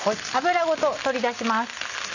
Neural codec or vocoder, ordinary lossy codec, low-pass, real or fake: none; none; 7.2 kHz; real